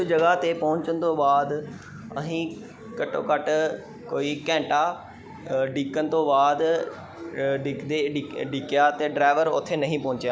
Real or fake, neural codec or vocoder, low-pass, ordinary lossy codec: real; none; none; none